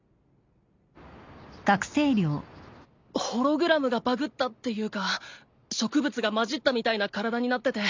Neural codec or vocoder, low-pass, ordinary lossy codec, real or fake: none; 7.2 kHz; MP3, 64 kbps; real